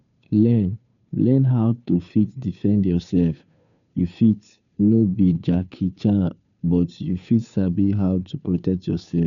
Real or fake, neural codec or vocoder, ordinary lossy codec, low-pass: fake; codec, 16 kHz, 2 kbps, FunCodec, trained on Chinese and English, 25 frames a second; none; 7.2 kHz